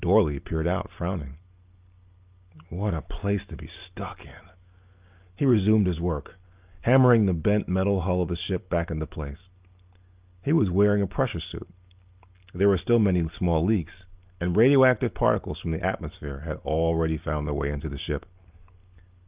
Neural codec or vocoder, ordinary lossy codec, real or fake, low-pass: none; Opus, 32 kbps; real; 3.6 kHz